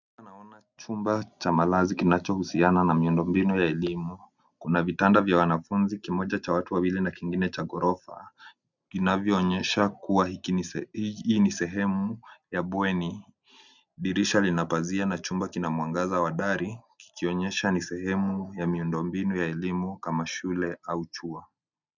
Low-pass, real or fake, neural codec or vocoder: 7.2 kHz; real; none